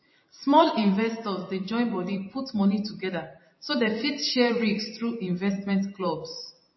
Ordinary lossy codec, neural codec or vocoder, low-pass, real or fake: MP3, 24 kbps; vocoder, 44.1 kHz, 128 mel bands every 512 samples, BigVGAN v2; 7.2 kHz; fake